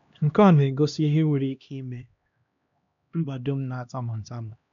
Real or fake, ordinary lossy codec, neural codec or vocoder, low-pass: fake; none; codec, 16 kHz, 1 kbps, X-Codec, HuBERT features, trained on LibriSpeech; 7.2 kHz